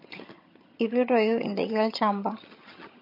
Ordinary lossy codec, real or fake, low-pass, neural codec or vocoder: MP3, 32 kbps; fake; 5.4 kHz; vocoder, 22.05 kHz, 80 mel bands, HiFi-GAN